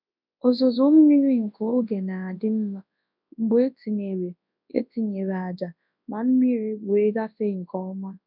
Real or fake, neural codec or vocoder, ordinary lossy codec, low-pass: fake; codec, 24 kHz, 0.9 kbps, WavTokenizer, large speech release; none; 5.4 kHz